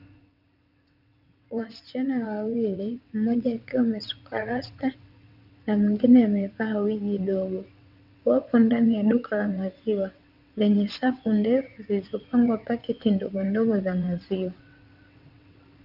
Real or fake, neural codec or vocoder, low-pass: fake; vocoder, 22.05 kHz, 80 mel bands, WaveNeXt; 5.4 kHz